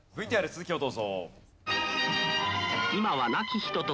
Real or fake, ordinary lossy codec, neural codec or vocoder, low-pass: real; none; none; none